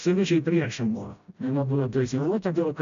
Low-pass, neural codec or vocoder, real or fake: 7.2 kHz; codec, 16 kHz, 0.5 kbps, FreqCodec, smaller model; fake